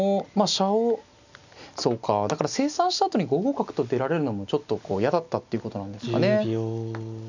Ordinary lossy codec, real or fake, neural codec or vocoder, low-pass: none; real; none; 7.2 kHz